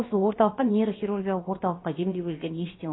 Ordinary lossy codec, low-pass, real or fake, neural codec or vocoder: AAC, 16 kbps; 7.2 kHz; fake; codec, 16 kHz, about 1 kbps, DyCAST, with the encoder's durations